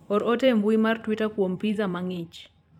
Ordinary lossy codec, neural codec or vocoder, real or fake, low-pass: none; vocoder, 44.1 kHz, 128 mel bands every 512 samples, BigVGAN v2; fake; 19.8 kHz